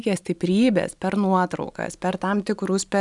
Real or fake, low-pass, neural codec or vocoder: real; 10.8 kHz; none